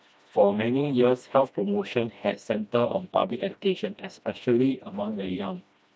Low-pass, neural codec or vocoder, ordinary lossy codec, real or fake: none; codec, 16 kHz, 1 kbps, FreqCodec, smaller model; none; fake